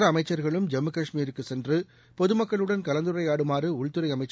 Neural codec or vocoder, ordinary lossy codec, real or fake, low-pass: none; none; real; none